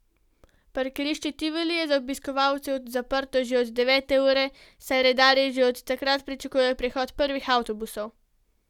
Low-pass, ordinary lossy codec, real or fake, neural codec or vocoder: 19.8 kHz; none; real; none